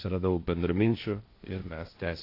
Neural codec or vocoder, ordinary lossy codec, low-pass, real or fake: codec, 16 kHz, 1.1 kbps, Voila-Tokenizer; AAC, 32 kbps; 5.4 kHz; fake